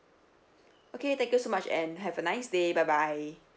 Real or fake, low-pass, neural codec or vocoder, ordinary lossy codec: real; none; none; none